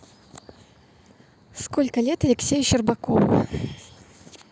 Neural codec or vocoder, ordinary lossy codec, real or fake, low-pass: none; none; real; none